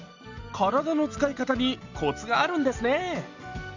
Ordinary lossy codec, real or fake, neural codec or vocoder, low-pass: Opus, 64 kbps; real; none; 7.2 kHz